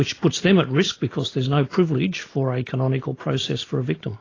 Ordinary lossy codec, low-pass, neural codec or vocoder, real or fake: AAC, 32 kbps; 7.2 kHz; none; real